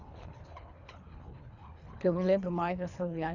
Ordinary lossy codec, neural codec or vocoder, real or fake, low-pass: none; codec, 24 kHz, 3 kbps, HILCodec; fake; 7.2 kHz